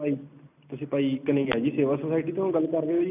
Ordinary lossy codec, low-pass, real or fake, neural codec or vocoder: none; 3.6 kHz; real; none